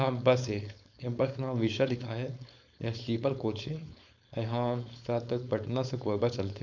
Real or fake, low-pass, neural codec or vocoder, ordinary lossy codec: fake; 7.2 kHz; codec, 16 kHz, 4.8 kbps, FACodec; none